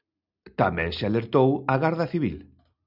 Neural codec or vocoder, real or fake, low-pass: none; real; 5.4 kHz